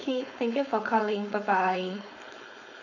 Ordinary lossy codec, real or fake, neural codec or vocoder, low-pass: none; fake; codec, 16 kHz, 4.8 kbps, FACodec; 7.2 kHz